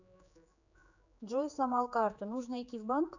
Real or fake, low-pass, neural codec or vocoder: fake; 7.2 kHz; codec, 16 kHz, 4 kbps, X-Codec, HuBERT features, trained on general audio